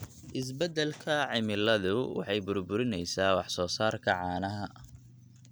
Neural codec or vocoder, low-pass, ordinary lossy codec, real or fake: none; none; none; real